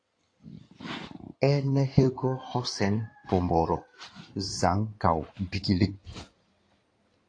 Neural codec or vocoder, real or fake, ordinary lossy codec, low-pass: codec, 16 kHz in and 24 kHz out, 2.2 kbps, FireRedTTS-2 codec; fake; AAC, 48 kbps; 9.9 kHz